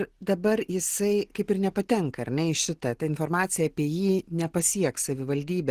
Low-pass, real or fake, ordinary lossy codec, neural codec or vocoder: 14.4 kHz; real; Opus, 16 kbps; none